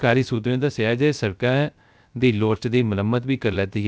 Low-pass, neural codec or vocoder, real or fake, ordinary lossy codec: none; codec, 16 kHz, 0.3 kbps, FocalCodec; fake; none